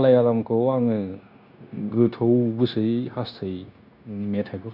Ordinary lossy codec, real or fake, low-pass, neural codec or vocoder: none; fake; 5.4 kHz; codec, 16 kHz, 0.9 kbps, LongCat-Audio-Codec